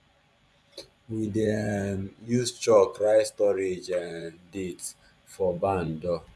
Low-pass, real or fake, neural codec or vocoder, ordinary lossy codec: none; fake; vocoder, 24 kHz, 100 mel bands, Vocos; none